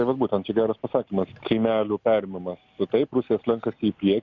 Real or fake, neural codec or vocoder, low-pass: real; none; 7.2 kHz